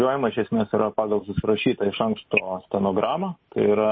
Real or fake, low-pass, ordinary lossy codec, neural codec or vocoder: real; 7.2 kHz; MP3, 24 kbps; none